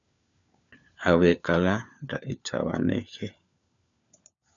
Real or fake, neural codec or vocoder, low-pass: fake; codec, 16 kHz, 4 kbps, FunCodec, trained on LibriTTS, 50 frames a second; 7.2 kHz